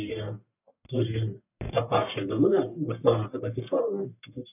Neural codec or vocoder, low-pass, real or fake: codec, 44.1 kHz, 1.7 kbps, Pupu-Codec; 3.6 kHz; fake